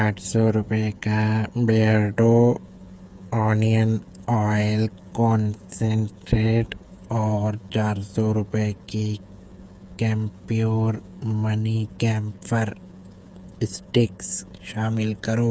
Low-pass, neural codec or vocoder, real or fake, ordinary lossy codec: none; codec, 16 kHz, 8 kbps, FreqCodec, smaller model; fake; none